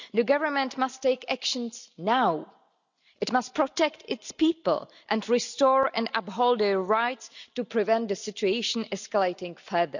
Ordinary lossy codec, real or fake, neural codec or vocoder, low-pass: none; real; none; 7.2 kHz